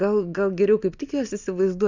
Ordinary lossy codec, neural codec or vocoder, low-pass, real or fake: Opus, 64 kbps; none; 7.2 kHz; real